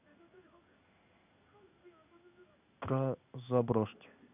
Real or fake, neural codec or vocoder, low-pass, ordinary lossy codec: fake; codec, 16 kHz in and 24 kHz out, 1 kbps, XY-Tokenizer; 3.6 kHz; none